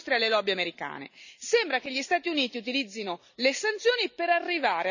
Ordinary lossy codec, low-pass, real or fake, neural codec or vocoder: none; 7.2 kHz; real; none